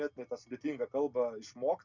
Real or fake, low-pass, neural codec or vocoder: real; 7.2 kHz; none